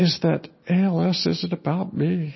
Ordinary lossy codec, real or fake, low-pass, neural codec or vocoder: MP3, 24 kbps; real; 7.2 kHz; none